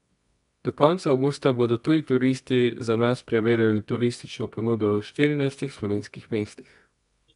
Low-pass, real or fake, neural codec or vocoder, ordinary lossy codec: 10.8 kHz; fake; codec, 24 kHz, 0.9 kbps, WavTokenizer, medium music audio release; none